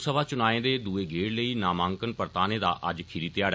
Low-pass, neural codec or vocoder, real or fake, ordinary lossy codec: none; none; real; none